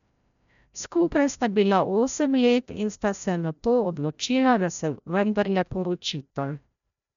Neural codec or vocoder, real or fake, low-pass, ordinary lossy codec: codec, 16 kHz, 0.5 kbps, FreqCodec, larger model; fake; 7.2 kHz; MP3, 96 kbps